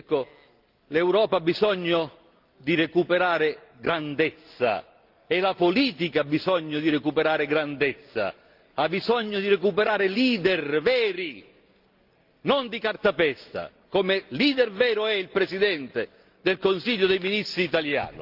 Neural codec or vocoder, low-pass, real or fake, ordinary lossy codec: none; 5.4 kHz; real; Opus, 32 kbps